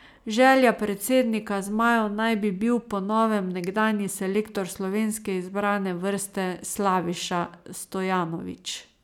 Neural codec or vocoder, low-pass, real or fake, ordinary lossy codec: none; 19.8 kHz; real; none